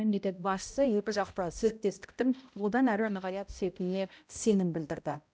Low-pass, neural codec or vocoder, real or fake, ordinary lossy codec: none; codec, 16 kHz, 0.5 kbps, X-Codec, HuBERT features, trained on balanced general audio; fake; none